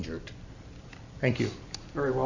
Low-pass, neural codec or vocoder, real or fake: 7.2 kHz; none; real